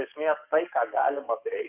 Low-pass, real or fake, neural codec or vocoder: 3.6 kHz; fake; codec, 44.1 kHz, 7.8 kbps, DAC